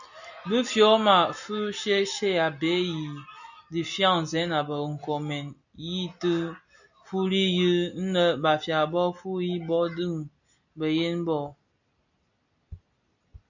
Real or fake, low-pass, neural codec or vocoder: real; 7.2 kHz; none